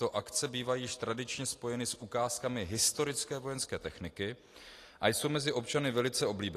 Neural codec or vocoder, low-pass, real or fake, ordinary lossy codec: none; 14.4 kHz; real; AAC, 48 kbps